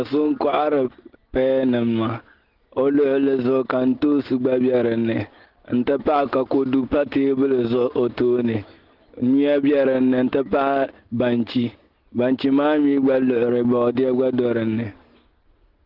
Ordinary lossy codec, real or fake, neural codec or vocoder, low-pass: Opus, 16 kbps; real; none; 5.4 kHz